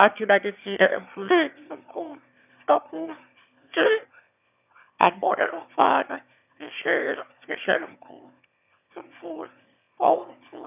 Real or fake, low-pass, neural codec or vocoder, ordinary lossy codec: fake; 3.6 kHz; autoencoder, 22.05 kHz, a latent of 192 numbers a frame, VITS, trained on one speaker; none